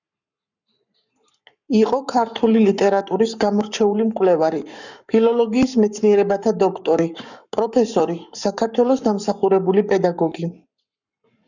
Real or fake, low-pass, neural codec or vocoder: fake; 7.2 kHz; codec, 44.1 kHz, 7.8 kbps, Pupu-Codec